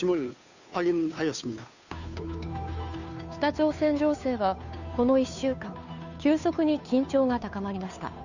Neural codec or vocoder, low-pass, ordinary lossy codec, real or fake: codec, 16 kHz, 2 kbps, FunCodec, trained on Chinese and English, 25 frames a second; 7.2 kHz; none; fake